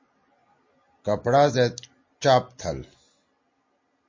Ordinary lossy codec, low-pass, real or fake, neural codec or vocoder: MP3, 32 kbps; 7.2 kHz; real; none